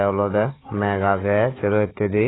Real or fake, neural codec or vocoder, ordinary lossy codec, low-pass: fake; codec, 16 kHz, 4 kbps, FunCodec, trained on Chinese and English, 50 frames a second; AAC, 16 kbps; 7.2 kHz